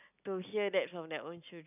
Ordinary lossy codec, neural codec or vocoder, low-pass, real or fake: none; none; 3.6 kHz; real